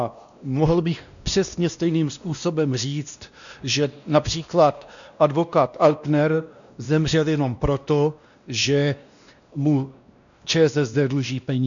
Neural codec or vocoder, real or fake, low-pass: codec, 16 kHz, 1 kbps, X-Codec, WavLM features, trained on Multilingual LibriSpeech; fake; 7.2 kHz